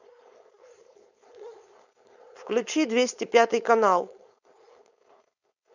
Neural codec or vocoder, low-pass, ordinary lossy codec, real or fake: codec, 16 kHz, 4.8 kbps, FACodec; 7.2 kHz; none; fake